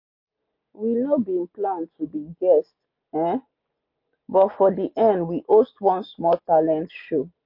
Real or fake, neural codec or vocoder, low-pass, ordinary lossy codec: real; none; 5.4 kHz; MP3, 32 kbps